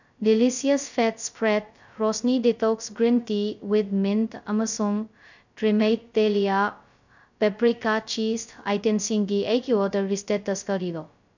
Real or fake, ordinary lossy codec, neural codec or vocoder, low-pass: fake; none; codec, 16 kHz, 0.2 kbps, FocalCodec; 7.2 kHz